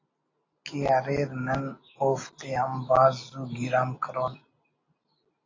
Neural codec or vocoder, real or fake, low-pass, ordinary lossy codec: none; real; 7.2 kHz; AAC, 32 kbps